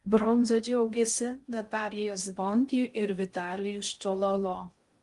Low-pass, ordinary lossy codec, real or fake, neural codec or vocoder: 10.8 kHz; Opus, 32 kbps; fake; codec, 16 kHz in and 24 kHz out, 0.6 kbps, FocalCodec, streaming, 2048 codes